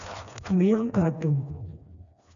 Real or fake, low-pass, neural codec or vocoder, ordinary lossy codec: fake; 7.2 kHz; codec, 16 kHz, 2 kbps, FreqCodec, smaller model; MP3, 96 kbps